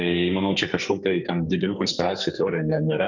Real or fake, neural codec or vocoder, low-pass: fake; codec, 44.1 kHz, 2.6 kbps, SNAC; 7.2 kHz